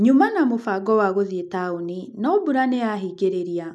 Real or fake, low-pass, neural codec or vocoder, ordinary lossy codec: real; none; none; none